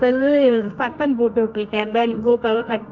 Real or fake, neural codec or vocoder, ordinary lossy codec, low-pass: fake; codec, 24 kHz, 0.9 kbps, WavTokenizer, medium music audio release; none; 7.2 kHz